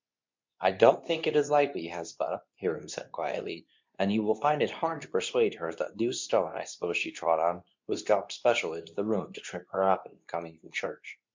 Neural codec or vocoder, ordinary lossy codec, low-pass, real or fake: codec, 24 kHz, 0.9 kbps, WavTokenizer, medium speech release version 2; MP3, 64 kbps; 7.2 kHz; fake